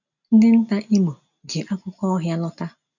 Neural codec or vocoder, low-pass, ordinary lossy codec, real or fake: none; 7.2 kHz; none; real